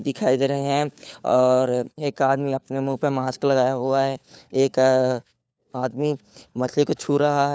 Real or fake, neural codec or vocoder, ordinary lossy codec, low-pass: fake; codec, 16 kHz, 4 kbps, FunCodec, trained on LibriTTS, 50 frames a second; none; none